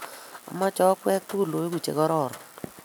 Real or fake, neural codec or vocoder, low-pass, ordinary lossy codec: real; none; none; none